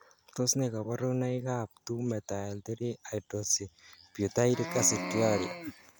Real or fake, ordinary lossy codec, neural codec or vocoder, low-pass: real; none; none; none